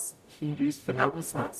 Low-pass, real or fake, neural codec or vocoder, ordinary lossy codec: 14.4 kHz; fake; codec, 44.1 kHz, 0.9 kbps, DAC; none